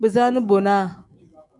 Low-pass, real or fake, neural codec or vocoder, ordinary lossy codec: 10.8 kHz; fake; autoencoder, 48 kHz, 128 numbers a frame, DAC-VAE, trained on Japanese speech; MP3, 96 kbps